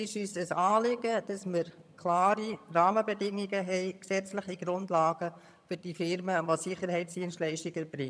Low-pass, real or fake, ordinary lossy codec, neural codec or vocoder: none; fake; none; vocoder, 22.05 kHz, 80 mel bands, HiFi-GAN